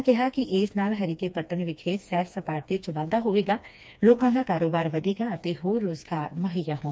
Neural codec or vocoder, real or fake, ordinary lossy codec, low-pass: codec, 16 kHz, 2 kbps, FreqCodec, smaller model; fake; none; none